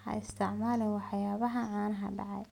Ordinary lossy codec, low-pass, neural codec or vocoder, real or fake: none; 19.8 kHz; none; real